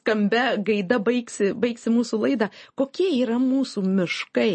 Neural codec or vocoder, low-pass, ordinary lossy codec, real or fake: none; 9.9 kHz; MP3, 32 kbps; real